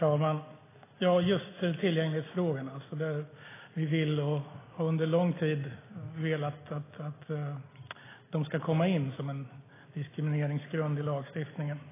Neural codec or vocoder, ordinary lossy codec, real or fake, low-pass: none; AAC, 16 kbps; real; 3.6 kHz